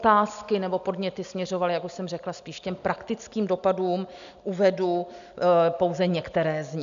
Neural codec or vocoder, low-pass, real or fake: none; 7.2 kHz; real